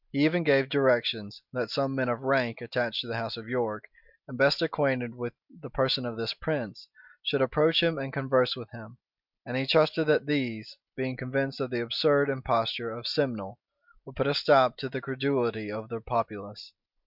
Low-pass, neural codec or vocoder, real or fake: 5.4 kHz; none; real